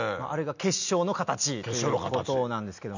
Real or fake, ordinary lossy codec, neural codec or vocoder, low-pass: real; none; none; 7.2 kHz